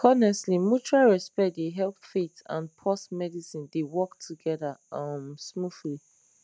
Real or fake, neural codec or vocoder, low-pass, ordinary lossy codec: real; none; none; none